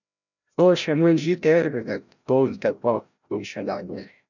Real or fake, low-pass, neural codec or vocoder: fake; 7.2 kHz; codec, 16 kHz, 0.5 kbps, FreqCodec, larger model